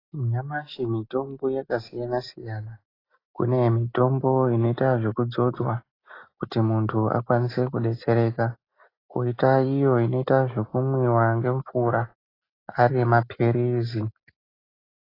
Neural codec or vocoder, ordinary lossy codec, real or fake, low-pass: none; AAC, 24 kbps; real; 5.4 kHz